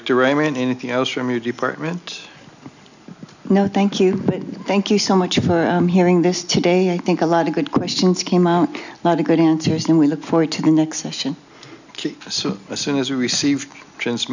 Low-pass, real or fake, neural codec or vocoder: 7.2 kHz; real; none